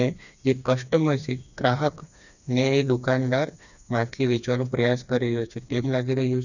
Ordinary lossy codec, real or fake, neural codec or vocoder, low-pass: none; fake; codec, 16 kHz, 2 kbps, FreqCodec, smaller model; 7.2 kHz